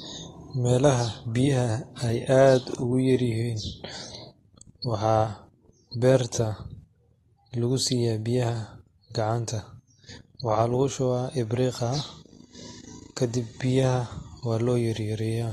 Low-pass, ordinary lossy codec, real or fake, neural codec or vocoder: 19.8 kHz; AAC, 32 kbps; real; none